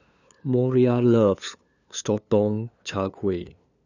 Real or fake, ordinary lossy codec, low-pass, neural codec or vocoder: fake; none; 7.2 kHz; codec, 16 kHz, 2 kbps, FunCodec, trained on LibriTTS, 25 frames a second